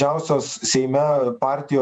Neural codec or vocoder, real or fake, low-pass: vocoder, 48 kHz, 128 mel bands, Vocos; fake; 9.9 kHz